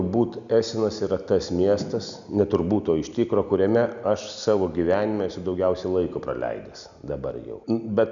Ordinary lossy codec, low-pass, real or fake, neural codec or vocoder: Opus, 64 kbps; 7.2 kHz; real; none